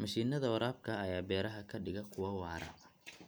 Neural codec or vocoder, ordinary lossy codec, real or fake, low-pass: none; none; real; none